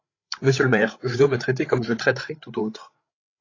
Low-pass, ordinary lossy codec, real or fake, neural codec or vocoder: 7.2 kHz; AAC, 32 kbps; fake; codec, 16 kHz, 8 kbps, FreqCodec, larger model